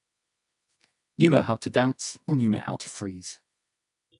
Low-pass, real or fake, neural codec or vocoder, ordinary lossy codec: 10.8 kHz; fake; codec, 24 kHz, 0.9 kbps, WavTokenizer, medium music audio release; none